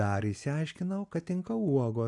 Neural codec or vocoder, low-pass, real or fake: none; 10.8 kHz; real